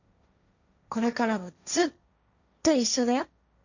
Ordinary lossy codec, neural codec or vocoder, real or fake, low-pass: none; codec, 16 kHz, 1.1 kbps, Voila-Tokenizer; fake; 7.2 kHz